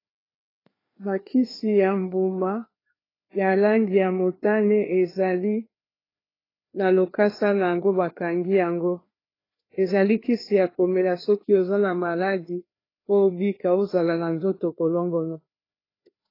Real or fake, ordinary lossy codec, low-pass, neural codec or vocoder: fake; AAC, 24 kbps; 5.4 kHz; codec, 16 kHz, 2 kbps, FreqCodec, larger model